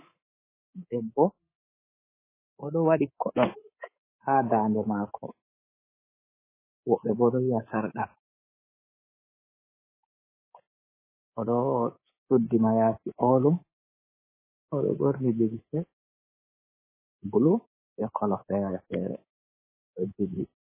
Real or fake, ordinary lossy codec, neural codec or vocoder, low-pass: fake; MP3, 16 kbps; codec, 24 kHz, 3.1 kbps, DualCodec; 3.6 kHz